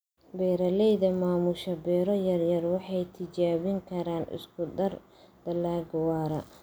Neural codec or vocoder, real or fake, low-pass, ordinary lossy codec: none; real; none; none